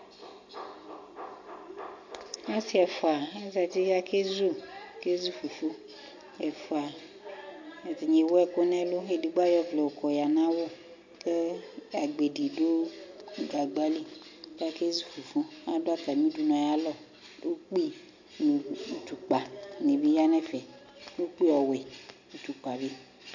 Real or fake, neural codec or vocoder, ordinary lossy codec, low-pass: real; none; MP3, 48 kbps; 7.2 kHz